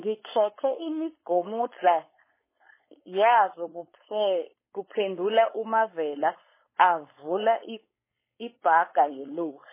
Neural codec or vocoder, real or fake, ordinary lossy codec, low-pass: codec, 16 kHz, 4.8 kbps, FACodec; fake; MP3, 16 kbps; 3.6 kHz